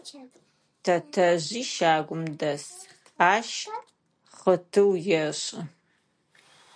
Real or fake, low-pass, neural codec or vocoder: real; 9.9 kHz; none